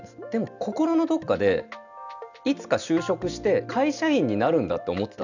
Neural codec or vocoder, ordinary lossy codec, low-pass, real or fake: none; none; 7.2 kHz; real